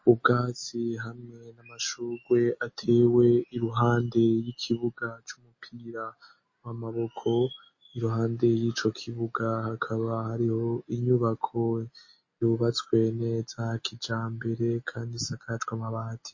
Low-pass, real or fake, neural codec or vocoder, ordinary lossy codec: 7.2 kHz; real; none; MP3, 32 kbps